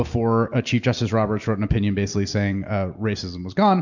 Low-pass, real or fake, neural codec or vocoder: 7.2 kHz; real; none